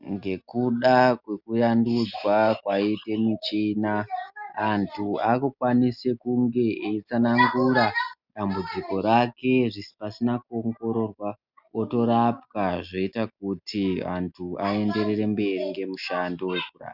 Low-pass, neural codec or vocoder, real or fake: 5.4 kHz; none; real